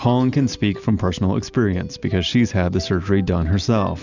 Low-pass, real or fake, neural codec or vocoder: 7.2 kHz; real; none